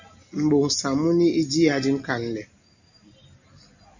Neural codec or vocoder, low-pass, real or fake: none; 7.2 kHz; real